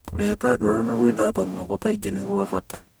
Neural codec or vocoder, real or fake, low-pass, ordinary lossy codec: codec, 44.1 kHz, 0.9 kbps, DAC; fake; none; none